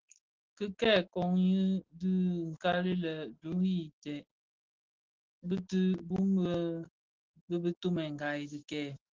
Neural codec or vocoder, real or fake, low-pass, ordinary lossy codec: none; real; 7.2 kHz; Opus, 16 kbps